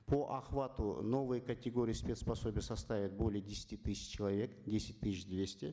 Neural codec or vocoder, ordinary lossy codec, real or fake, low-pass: none; none; real; none